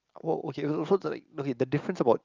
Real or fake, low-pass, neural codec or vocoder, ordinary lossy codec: real; 7.2 kHz; none; Opus, 24 kbps